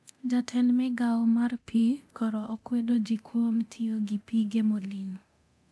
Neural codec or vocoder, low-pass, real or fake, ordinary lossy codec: codec, 24 kHz, 0.9 kbps, DualCodec; none; fake; none